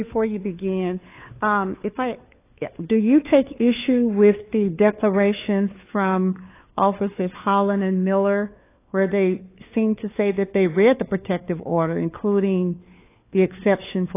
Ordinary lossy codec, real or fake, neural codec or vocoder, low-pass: AAC, 24 kbps; fake; codec, 16 kHz, 4 kbps, FunCodec, trained on LibriTTS, 50 frames a second; 3.6 kHz